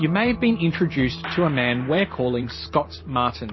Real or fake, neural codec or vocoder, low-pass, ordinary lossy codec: fake; vocoder, 44.1 kHz, 128 mel bands every 512 samples, BigVGAN v2; 7.2 kHz; MP3, 24 kbps